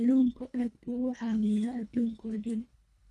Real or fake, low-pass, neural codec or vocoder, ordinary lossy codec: fake; none; codec, 24 kHz, 1.5 kbps, HILCodec; none